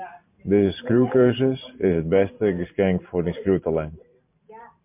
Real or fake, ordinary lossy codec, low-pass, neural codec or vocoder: fake; MP3, 32 kbps; 3.6 kHz; vocoder, 44.1 kHz, 128 mel bands every 512 samples, BigVGAN v2